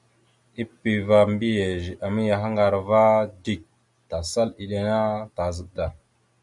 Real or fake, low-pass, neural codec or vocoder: real; 10.8 kHz; none